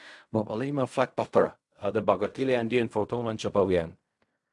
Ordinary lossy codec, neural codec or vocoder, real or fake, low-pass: AAC, 64 kbps; codec, 16 kHz in and 24 kHz out, 0.4 kbps, LongCat-Audio-Codec, fine tuned four codebook decoder; fake; 10.8 kHz